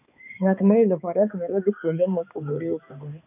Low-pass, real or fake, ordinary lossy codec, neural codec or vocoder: 3.6 kHz; fake; none; codec, 16 kHz, 2 kbps, X-Codec, HuBERT features, trained on balanced general audio